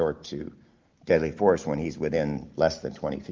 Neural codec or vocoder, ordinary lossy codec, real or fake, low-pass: none; Opus, 24 kbps; real; 7.2 kHz